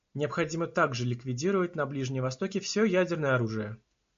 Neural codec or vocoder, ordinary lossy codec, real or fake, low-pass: none; MP3, 48 kbps; real; 7.2 kHz